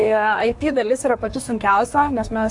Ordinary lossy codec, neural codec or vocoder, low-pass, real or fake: AAC, 64 kbps; codec, 24 kHz, 1 kbps, SNAC; 10.8 kHz; fake